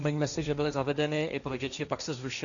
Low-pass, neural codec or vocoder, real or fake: 7.2 kHz; codec, 16 kHz, 1.1 kbps, Voila-Tokenizer; fake